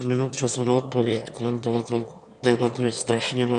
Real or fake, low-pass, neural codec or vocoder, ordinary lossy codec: fake; 9.9 kHz; autoencoder, 22.05 kHz, a latent of 192 numbers a frame, VITS, trained on one speaker; AAC, 96 kbps